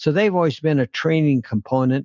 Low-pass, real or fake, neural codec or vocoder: 7.2 kHz; real; none